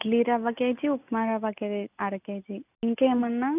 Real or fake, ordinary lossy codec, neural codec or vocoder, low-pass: real; none; none; 3.6 kHz